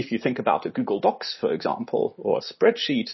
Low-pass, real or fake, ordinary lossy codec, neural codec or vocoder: 7.2 kHz; real; MP3, 24 kbps; none